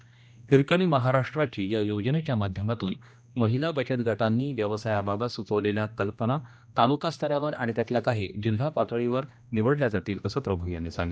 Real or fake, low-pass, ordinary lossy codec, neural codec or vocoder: fake; none; none; codec, 16 kHz, 1 kbps, X-Codec, HuBERT features, trained on general audio